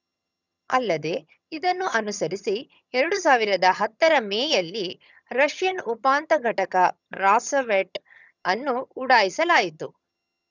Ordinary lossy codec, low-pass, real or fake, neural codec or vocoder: none; 7.2 kHz; fake; vocoder, 22.05 kHz, 80 mel bands, HiFi-GAN